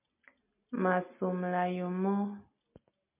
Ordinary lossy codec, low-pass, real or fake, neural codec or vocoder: MP3, 32 kbps; 3.6 kHz; real; none